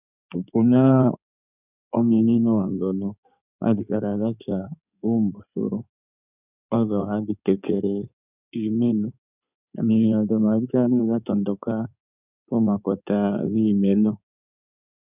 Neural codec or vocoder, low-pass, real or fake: codec, 16 kHz in and 24 kHz out, 2.2 kbps, FireRedTTS-2 codec; 3.6 kHz; fake